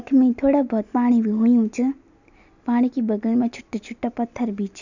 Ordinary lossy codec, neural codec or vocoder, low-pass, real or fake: none; none; 7.2 kHz; real